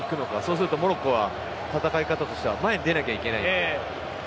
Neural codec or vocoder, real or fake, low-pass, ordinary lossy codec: none; real; none; none